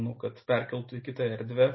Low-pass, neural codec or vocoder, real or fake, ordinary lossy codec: 7.2 kHz; none; real; MP3, 24 kbps